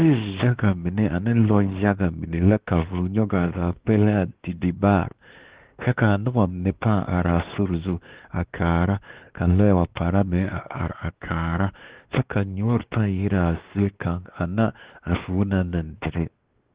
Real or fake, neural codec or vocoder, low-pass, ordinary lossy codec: fake; codec, 16 kHz, 2 kbps, X-Codec, WavLM features, trained on Multilingual LibriSpeech; 3.6 kHz; Opus, 16 kbps